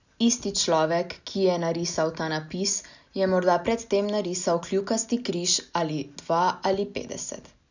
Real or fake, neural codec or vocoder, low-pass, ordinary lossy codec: real; none; 7.2 kHz; none